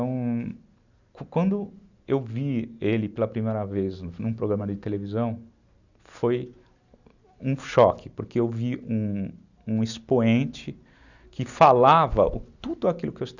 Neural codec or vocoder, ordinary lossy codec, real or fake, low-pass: none; none; real; 7.2 kHz